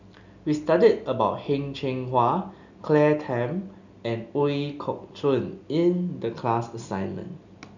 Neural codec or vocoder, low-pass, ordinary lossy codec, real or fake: none; 7.2 kHz; none; real